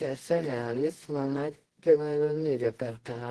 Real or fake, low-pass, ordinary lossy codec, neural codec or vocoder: fake; 10.8 kHz; Opus, 16 kbps; codec, 24 kHz, 0.9 kbps, WavTokenizer, medium music audio release